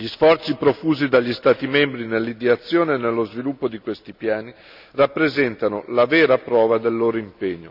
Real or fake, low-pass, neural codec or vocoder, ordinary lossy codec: real; 5.4 kHz; none; none